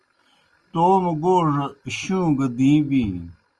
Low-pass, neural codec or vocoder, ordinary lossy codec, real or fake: 10.8 kHz; none; Opus, 32 kbps; real